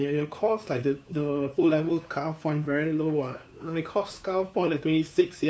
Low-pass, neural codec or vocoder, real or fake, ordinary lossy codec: none; codec, 16 kHz, 2 kbps, FunCodec, trained on LibriTTS, 25 frames a second; fake; none